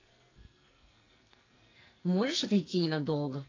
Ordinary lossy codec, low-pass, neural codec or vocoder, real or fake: AAC, 32 kbps; 7.2 kHz; codec, 44.1 kHz, 2.6 kbps, SNAC; fake